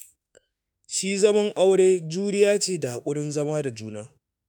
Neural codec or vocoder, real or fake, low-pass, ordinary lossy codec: autoencoder, 48 kHz, 32 numbers a frame, DAC-VAE, trained on Japanese speech; fake; none; none